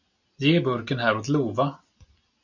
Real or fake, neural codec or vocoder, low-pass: real; none; 7.2 kHz